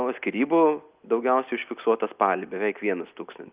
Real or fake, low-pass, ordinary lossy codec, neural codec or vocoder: real; 3.6 kHz; Opus, 24 kbps; none